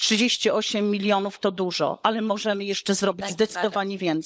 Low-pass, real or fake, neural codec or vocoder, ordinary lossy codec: none; fake; codec, 16 kHz, 16 kbps, FunCodec, trained on LibriTTS, 50 frames a second; none